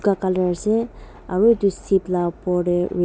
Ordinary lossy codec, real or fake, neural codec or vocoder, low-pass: none; real; none; none